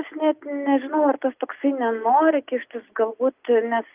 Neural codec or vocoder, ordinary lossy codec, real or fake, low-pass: none; Opus, 32 kbps; real; 3.6 kHz